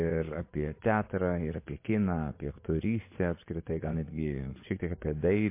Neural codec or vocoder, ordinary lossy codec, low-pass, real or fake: vocoder, 24 kHz, 100 mel bands, Vocos; MP3, 24 kbps; 3.6 kHz; fake